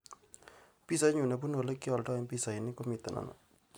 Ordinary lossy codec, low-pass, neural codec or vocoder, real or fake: none; none; none; real